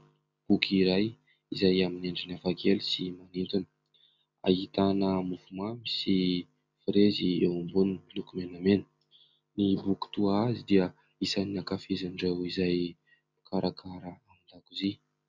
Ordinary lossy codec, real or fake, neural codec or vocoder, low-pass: Opus, 64 kbps; real; none; 7.2 kHz